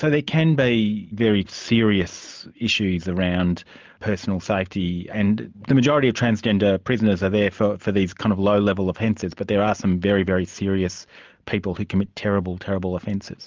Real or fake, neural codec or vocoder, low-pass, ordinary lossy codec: real; none; 7.2 kHz; Opus, 24 kbps